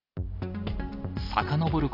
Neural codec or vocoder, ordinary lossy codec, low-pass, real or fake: none; AAC, 32 kbps; 5.4 kHz; real